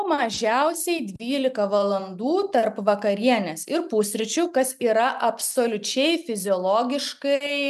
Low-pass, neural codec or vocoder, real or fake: 14.4 kHz; none; real